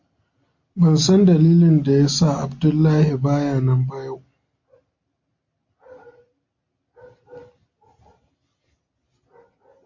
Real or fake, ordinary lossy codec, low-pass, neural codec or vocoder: real; MP3, 48 kbps; 7.2 kHz; none